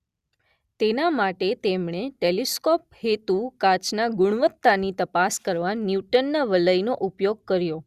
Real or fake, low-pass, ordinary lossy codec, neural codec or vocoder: real; 14.4 kHz; Opus, 64 kbps; none